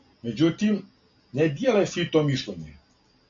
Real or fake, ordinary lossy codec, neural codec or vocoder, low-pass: real; AAC, 48 kbps; none; 7.2 kHz